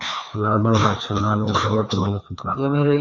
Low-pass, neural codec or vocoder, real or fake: 7.2 kHz; codec, 16 kHz, 2 kbps, FreqCodec, larger model; fake